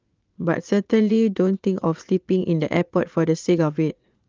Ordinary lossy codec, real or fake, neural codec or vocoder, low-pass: Opus, 32 kbps; fake; vocoder, 44.1 kHz, 80 mel bands, Vocos; 7.2 kHz